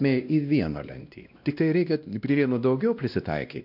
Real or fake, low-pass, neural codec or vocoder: fake; 5.4 kHz; codec, 16 kHz, 1 kbps, X-Codec, WavLM features, trained on Multilingual LibriSpeech